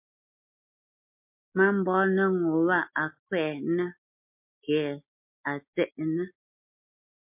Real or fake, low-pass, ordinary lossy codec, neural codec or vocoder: real; 3.6 kHz; MP3, 32 kbps; none